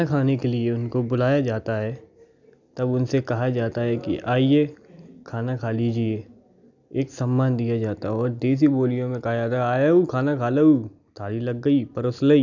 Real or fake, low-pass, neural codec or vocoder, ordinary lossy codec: real; 7.2 kHz; none; none